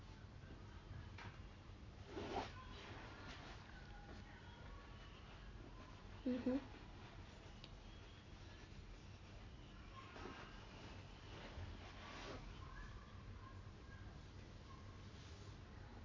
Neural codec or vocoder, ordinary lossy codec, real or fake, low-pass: none; MP3, 48 kbps; real; 7.2 kHz